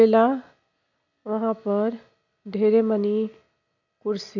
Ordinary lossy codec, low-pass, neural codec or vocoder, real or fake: none; 7.2 kHz; none; real